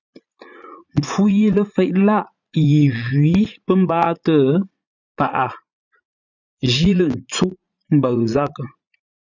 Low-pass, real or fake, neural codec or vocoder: 7.2 kHz; fake; vocoder, 44.1 kHz, 80 mel bands, Vocos